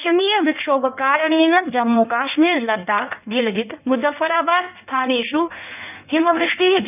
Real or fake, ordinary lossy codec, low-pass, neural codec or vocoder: fake; none; 3.6 kHz; codec, 16 kHz in and 24 kHz out, 1.1 kbps, FireRedTTS-2 codec